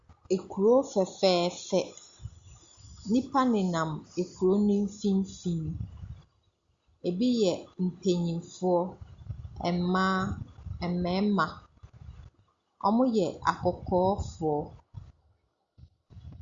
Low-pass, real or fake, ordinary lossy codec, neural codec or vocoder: 7.2 kHz; real; Opus, 64 kbps; none